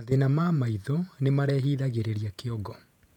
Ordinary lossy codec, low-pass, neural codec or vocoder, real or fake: none; 19.8 kHz; vocoder, 48 kHz, 128 mel bands, Vocos; fake